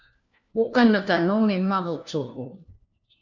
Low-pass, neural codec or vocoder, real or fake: 7.2 kHz; codec, 16 kHz, 1 kbps, FunCodec, trained on LibriTTS, 50 frames a second; fake